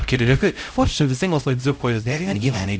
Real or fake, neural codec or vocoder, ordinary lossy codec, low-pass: fake; codec, 16 kHz, 0.5 kbps, X-Codec, HuBERT features, trained on LibriSpeech; none; none